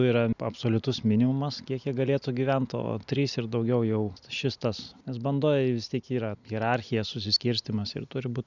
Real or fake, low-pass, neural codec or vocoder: real; 7.2 kHz; none